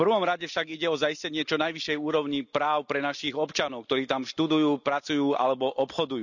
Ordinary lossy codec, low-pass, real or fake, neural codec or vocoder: none; 7.2 kHz; real; none